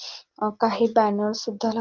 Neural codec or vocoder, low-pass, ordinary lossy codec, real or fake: none; 7.2 kHz; Opus, 32 kbps; real